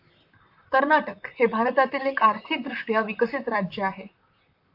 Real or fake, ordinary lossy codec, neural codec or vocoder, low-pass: fake; AAC, 48 kbps; vocoder, 44.1 kHz, 128 mel bands, Pupu-Vocoder; 5.4 kHz